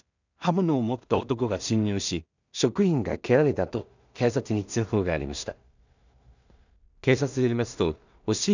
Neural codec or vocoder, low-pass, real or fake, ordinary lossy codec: codec, 16 kHz in and 24 kHz out, 0.4 kbps, LongCat-Audio-Codec, two codebook decoder; 7.2 kHz; fake; none